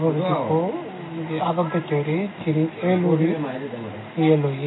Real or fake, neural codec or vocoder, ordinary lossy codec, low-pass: real; none; AAC, 16 kbps; 7.2 kHz